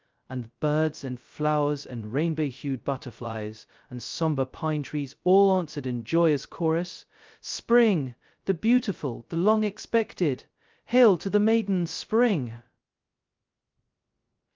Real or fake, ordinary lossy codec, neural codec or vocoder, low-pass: fake; Opus, 24 kbps; codec, 16 kHz, 0.2 kbps, FocalCodec; 7.2 kHz